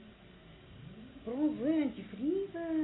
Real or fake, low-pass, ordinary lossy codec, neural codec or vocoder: real; 7.2 kHz; AAC, 16 kbps; none